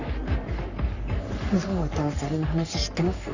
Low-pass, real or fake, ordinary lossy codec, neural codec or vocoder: 7.2 kHz; fake; none; codec, 44.1 kHz, 3.4 kbps, Pupu-Codec